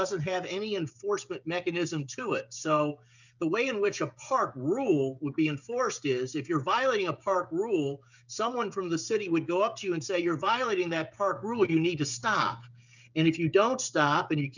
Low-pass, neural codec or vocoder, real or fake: 7.2 kHz; codec, 16 kHz, 16 kbps, FreqCodec, smaller model; fake